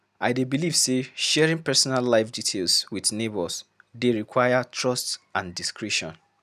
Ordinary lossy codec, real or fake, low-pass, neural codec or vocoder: none; real; 14.4 kHz; none